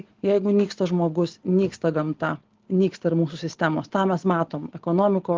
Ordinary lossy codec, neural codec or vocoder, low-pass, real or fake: Opus, 16 kbps; none; 7.2 kHz; real